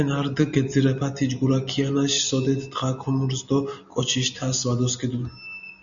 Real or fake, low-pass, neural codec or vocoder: real; 7.2 kHz; none